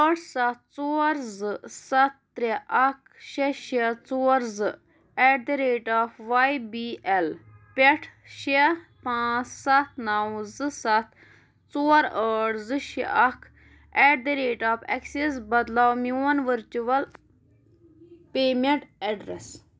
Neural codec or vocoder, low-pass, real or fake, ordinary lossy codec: none; none; real; none